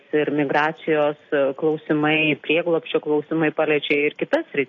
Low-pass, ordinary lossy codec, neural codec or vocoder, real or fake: 7.2 kHz; AAC, 32 kbps; none; real